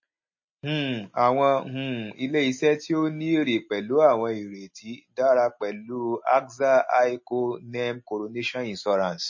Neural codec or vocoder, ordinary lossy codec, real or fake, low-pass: none; MP3, 32 kbps; real; 7.2 kHz